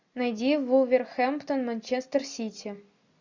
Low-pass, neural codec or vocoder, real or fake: 7.2 kHz; none; real